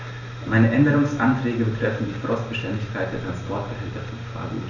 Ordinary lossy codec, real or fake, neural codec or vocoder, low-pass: none; real; none; 7.2 kHz